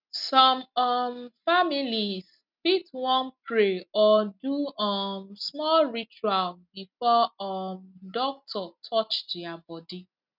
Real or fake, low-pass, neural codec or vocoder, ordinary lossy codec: real; 5.4 kHz; none; none